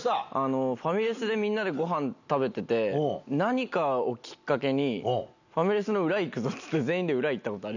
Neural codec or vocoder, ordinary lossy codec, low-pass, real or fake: none; none; 7.2 kHz; real